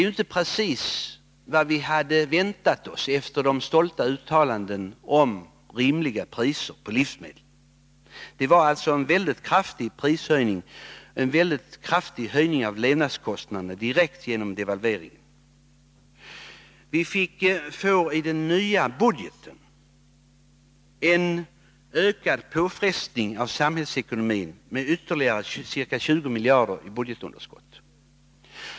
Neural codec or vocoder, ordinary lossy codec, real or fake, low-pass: none; none; real; none